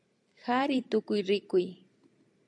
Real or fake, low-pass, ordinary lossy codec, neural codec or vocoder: fake; 9.9 kHz; AAC, 64 kbps; vocoder, 44.1 kHz, 128 mel bands every 256 samples, BigVGAN v2